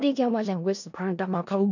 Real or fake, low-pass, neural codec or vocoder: fake; 7.2 kHz; codec, 16 kHz in and 24 kHz out, 0.4 kbps, LongCat-Audio-Codec, four codebook decoder